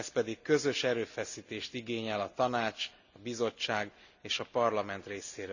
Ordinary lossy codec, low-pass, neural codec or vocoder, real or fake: none; 7.2 kHz; none; real